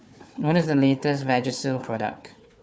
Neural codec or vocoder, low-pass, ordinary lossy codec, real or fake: codec, 16 kHz, 4 kbps, FunCodec, trained on Chinese and English, 50 frames a second; none; none; fake